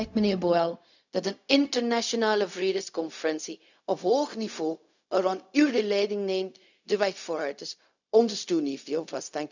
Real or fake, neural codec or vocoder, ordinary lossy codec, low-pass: fake; codec, 16 kHz, 0.4 kbps, LongCat-Audio-Codec; none; 7.2 kHz